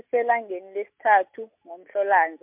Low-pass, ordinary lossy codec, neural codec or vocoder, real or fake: 3.6 kHz; MP3, 32 kbps; none; real